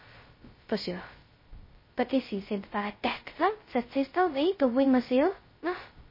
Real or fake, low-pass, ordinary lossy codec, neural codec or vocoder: fake; 5.4 kHz; MP3, 24 kbps; codec, 16 kHz, 0.2 kbps, FocalCodec